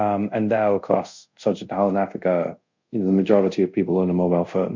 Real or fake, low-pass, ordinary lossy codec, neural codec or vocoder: fake; 7.2 kHz; MP3, 64 kbps; codec, 24 kHz, 0.5 kbps, DualCodec